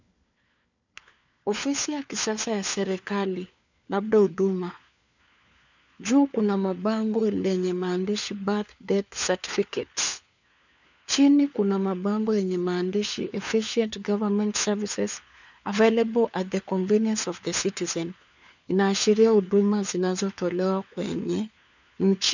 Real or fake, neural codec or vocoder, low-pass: fake; codec, 16 kHz, 4 kbps, FunCodec, trained on LibriTTS, 50 frames a second; 7.2 kHz